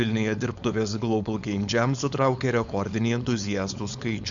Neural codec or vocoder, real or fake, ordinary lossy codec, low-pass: codec, 16 kHz, 4.8 kbps, FACodec; fake; Opus, 64 kbps; 7.2 kHz